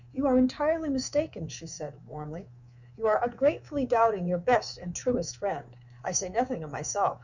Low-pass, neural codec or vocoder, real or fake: 7.2 kHz; codec, 24 kHz, 3.1 kbps, DualCodec; fake